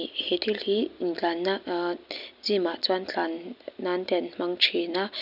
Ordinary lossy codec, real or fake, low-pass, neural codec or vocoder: none; real; 5.4 kHz; none